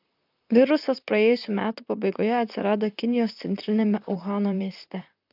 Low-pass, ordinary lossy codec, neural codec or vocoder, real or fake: 5.4 kHz; AAC, 48 kbps; vocoder, 44.1 kHz, 128 mel bands, Pupu-Vocoder; fake